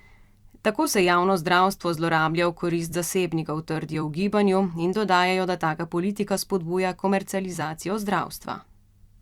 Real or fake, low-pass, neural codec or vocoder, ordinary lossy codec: fake; 19.8 kHz; vocoder, 44.1 kHz, 128 mel bands every 256 samples, BigVGAN v2; Opus, 64 kbps